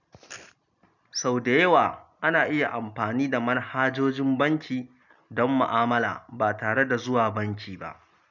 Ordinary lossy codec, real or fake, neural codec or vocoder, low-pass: none; real; none; 7.2 kHz